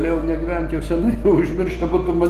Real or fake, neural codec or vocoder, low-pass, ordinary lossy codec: real; none; 14.4 kHz; Opus, 16 kbps